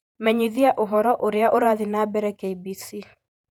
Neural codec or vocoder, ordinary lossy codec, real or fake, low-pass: vocoder, 48 kHz, 128 mel bands, Vocos; none; fake; 19.8 kHz